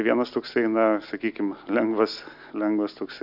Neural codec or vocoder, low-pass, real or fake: none; 5.4 kHz; real